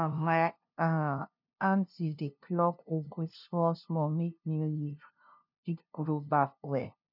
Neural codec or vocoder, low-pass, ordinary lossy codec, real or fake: codec, 16 kHz, 0.5 kbps, FunCodec, trained on LibriTTS, 25 frames a second; 5.4 kHz; none; fake